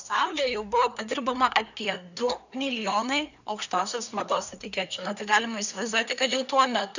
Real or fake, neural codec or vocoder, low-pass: fake; codec, 24 kHz, 1 kbps, SNAC; 7.2 kHz